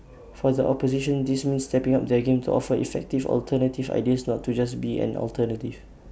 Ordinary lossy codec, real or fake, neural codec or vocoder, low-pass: none; real; none; none